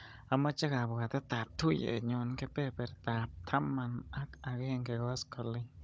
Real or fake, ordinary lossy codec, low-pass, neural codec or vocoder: fake; none; none; codec, 16 kHz, 16 kbps, FunCodec, trained on Chinese and English, 50 frames a second